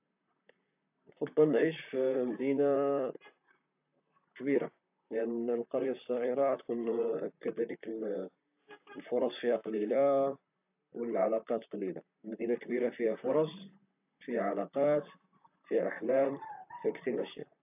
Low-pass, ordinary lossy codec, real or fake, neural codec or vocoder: 3.6 kHz; AAC, 32 kbps; fake; vocoder, 44.1 kHz, 128 mel bands, Pupu-Vocoder